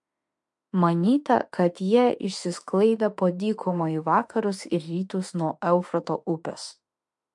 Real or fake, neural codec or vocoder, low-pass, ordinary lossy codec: fake; autoencoder, 48 kHz, 32 numbers a frame, DAC-VAE, trained on Japanese speech; 10.8 kHz; MP3, 64 kbps